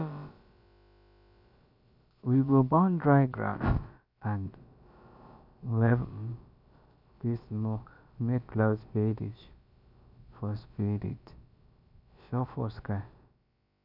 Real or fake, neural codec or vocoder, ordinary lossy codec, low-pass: fake; codec, 16 kHz, about 1 kbps, DyCAST, with the encoder's durations; none; 5.4 kHz